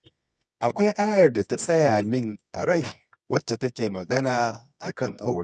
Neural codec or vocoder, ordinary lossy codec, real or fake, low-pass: codec, 24 kHz, 0.9 kbps, WavTokenizer, medium music audio release; none; fake; none